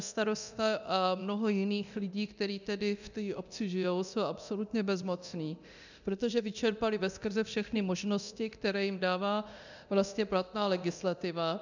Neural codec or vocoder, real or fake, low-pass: codec, 24 kHz, 0.9 kbps, DualCodec; fake; 7.2 kHz